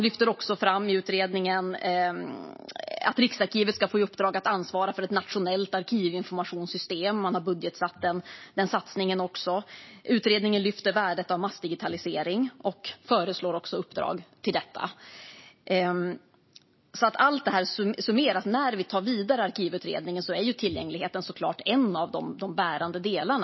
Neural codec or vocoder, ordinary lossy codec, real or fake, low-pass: none; MP3, 24 kbps; real; 7.2 kHz